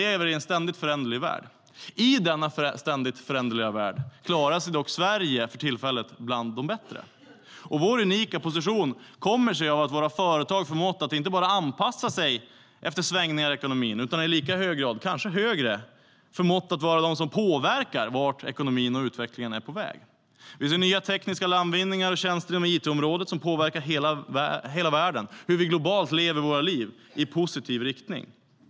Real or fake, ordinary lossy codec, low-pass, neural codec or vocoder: real; none; none; none